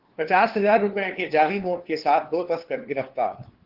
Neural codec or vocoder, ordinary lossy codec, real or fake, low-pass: codec, 16 kHz, 2 kbps, FunCodec, trained on LibriTTS, 25 frames a second; Opus, 16 kbps; fake; 5.4 kHz